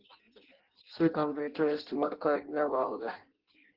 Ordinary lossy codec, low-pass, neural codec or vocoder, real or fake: Opus, 16 kbps; 5.4 kHz; codec, 16 kHz in and 24 kHz out, 0.6 kbps, FireRedTTS-2 codec; fake